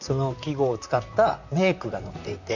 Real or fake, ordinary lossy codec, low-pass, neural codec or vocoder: fake; none; 7.2 kHz; vocoder, 44.1 kHz, 128 mel bands, Pupu-Vocoder